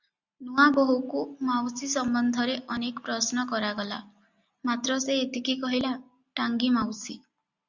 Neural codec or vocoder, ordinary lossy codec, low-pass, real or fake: none; Opus, 64 kbps; 7.2 kHz; real